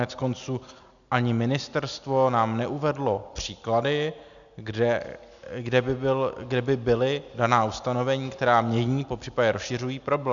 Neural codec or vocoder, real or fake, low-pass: none; real; 7.2 kHz